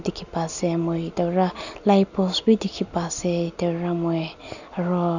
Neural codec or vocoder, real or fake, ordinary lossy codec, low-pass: none; real; none; 7.2 kHz